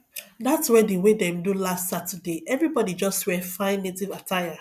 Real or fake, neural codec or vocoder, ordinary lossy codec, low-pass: real; none; none; 14.4 kHz